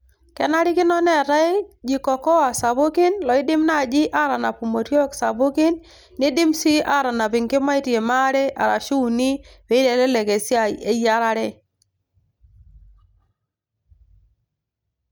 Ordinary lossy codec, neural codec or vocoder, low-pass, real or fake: none; none; none; real